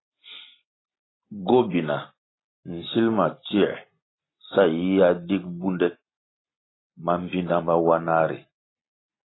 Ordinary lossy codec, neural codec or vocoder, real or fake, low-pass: AAC, 16 kbps; none; real; 7.2 kHz